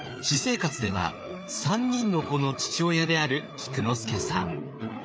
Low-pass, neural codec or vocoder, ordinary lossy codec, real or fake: none; codec, 16 kHz, 4 kbps, FreqCodec, larger model; none; fake